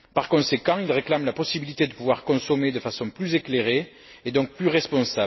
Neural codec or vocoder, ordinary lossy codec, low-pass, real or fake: none; MP3, 24 kbps; 7.2 kHz; real